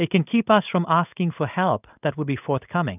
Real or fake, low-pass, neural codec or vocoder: fake; 3.6 kHz; codec, 16 kHz in and 24 kHz out, 1 kbps, XY-Tokenizer